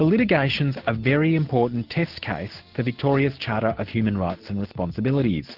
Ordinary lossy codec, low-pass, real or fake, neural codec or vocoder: Opus, 16 kbps; 5.4 kHz; real; none